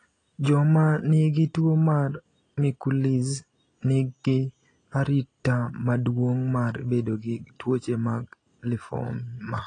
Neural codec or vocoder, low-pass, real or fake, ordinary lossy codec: none; 9.9 kHz; real; AAC, 32 kbps